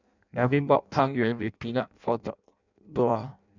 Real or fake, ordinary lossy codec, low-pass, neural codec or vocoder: fake; none; 7.2 kHz; codec, 16 kHz in and 24 kHz out, 0.6 kbps, FireRedTTS-2 codec